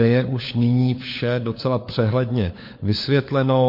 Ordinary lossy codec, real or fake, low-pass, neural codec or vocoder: MP3, 32 kbps; fake; 5.4 kHz; codec, 16 kHz, 4 kbps, FunCodec, trained on LibriTTS, 50 frames a second